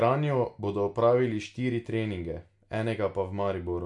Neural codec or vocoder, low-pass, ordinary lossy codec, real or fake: none; 10.8 kHz; MP3, 64 kbps; real